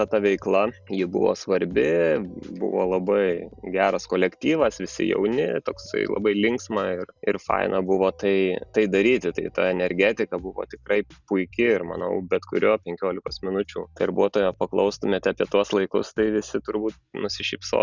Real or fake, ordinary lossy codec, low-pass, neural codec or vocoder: real; Opus, 64 kbps; 7.2 kHz; none